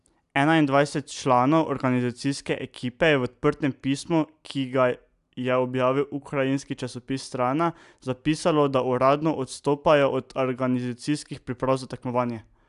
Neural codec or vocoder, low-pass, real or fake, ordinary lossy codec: none; 10.8 kHz; real; AAC, 96 kbps